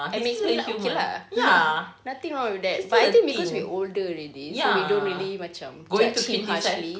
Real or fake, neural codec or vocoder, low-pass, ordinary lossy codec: real; none; none; none